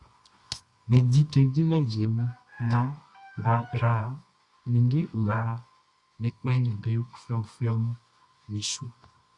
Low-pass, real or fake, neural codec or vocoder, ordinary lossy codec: 10.8 kHz; fake; codec, 24 kHz, 0.9 kbps, WavTokenizer, medium music audio release; Opus, 64 kbps